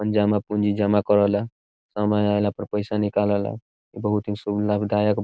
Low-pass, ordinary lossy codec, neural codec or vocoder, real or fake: none; none; none; real